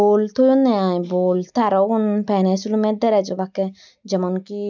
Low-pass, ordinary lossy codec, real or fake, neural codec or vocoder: 7.2 kHz; none; real; none